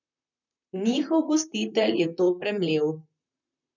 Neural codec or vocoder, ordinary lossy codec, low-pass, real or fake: vocoder, 44.1 kHz, 128 mel bands, Pupu-Vocoder; none; 7.2 kHz; fake